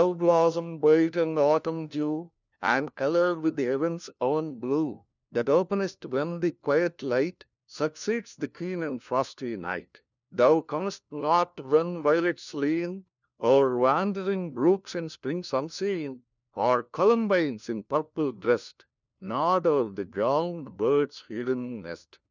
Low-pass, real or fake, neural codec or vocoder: 7.2 kHz; fake; codec, 16 kHz, 1 kbps, FunCodec, trained on LibriTTS, 50 frames a second